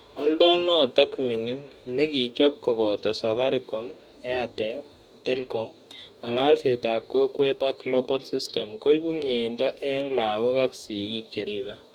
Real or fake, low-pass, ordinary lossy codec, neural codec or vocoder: fake; 19.8 kHz; none; codec, 44.1 kHz, 2.6 kbps, DAC